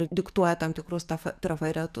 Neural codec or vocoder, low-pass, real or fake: codec, 44.1 kHz, 7.8 kbps, DAC; 14.4 kHz; fake